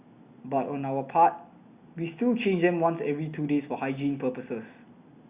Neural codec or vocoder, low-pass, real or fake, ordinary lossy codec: none; 3.6 kHz; real; Opus, 64 kbps